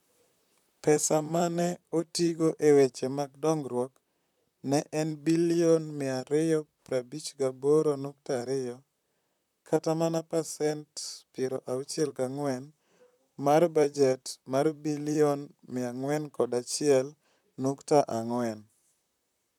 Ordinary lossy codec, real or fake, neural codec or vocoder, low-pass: none; fake; vocoder, 44.1 kHz, 128 mel bands, Pupu-Vocoder; 19.8 kHz